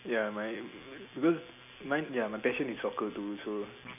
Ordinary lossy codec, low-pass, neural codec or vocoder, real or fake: none; 3.6 kHz; none; real